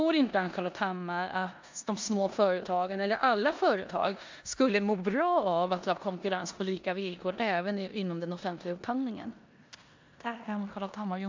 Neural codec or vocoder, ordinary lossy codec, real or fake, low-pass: codec, 16 kHz in and 24 kHz out, 0.9 kbps, LongCat-Audio-Codec, four codebook decoder; MP3, 64 kbps; fake; 7.2 kHz